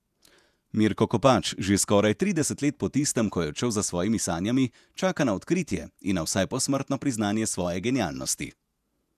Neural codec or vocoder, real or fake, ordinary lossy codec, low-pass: vocoder, 48 kHz, 128 mel bands, Vocos; fake; none; 14.4 kHz